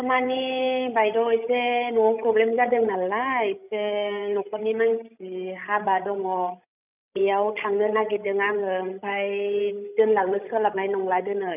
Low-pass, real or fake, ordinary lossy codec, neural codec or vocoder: 3.6 kHz; fake; none; codec, 16 kHz, 16 kbps, FreqCodec, larger model